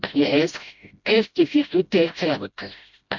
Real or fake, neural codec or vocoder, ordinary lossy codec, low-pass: fake; codec, 16 kHz, 0.5 kbps, FreqCodec, smaller model; MP3, 64 kbps; 7.2 kHz